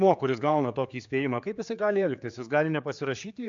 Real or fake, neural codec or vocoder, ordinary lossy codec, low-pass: fake; codec, 16 kHz, 4 kbps, X-Codec, HuBERT features, trained on general audio; AAC, 64 kbps; 7.2 kHz